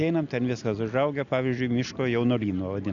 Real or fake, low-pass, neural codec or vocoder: real; 7.2 kHz; none